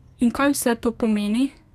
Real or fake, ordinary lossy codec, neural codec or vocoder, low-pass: fake; none; codec, 32 kHz, 1.9 kbps, SNAC; 14.4 kHz